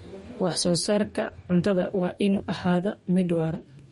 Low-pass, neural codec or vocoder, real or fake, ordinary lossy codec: 19.8 kHz; codec, 44.1 kHz, 2.6 kbps, DAC; fake; MP3, 48 kbps